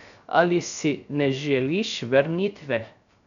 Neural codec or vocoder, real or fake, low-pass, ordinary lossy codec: codec, 16 kHz, 0.3 kbps, FocalCodec; fake; 7.2 kHz; none